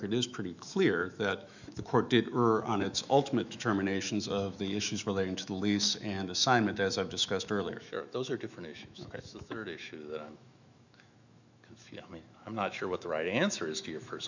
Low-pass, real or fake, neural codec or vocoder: 7.2 kHz; fake; autoencoder, 48 kHz, 128 numbers a frame, DAC-VAE, trained on Japanese speech